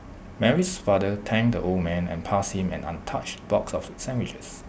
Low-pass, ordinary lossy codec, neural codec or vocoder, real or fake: none; none; none; real